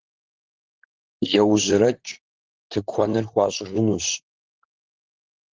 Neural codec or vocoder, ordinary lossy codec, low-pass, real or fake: vocoder, 44.1 kHz, 128 mel bands, Pupu-Vocoder; Opus, 16 kbps; 7.2 kHz; fake